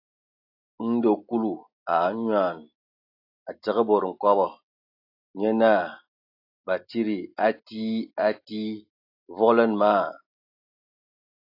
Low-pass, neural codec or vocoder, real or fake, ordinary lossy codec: 5.4 kHz; none; real; AAC, 32 kbps